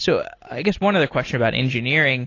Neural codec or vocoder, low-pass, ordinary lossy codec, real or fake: none; 7.2 kHz; AAC, 32 kbps; real